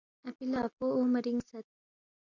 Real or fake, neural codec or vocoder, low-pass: real; none; 7.2 kHz